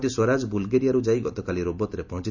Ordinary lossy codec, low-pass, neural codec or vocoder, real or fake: none; 7.2 kHz; none; real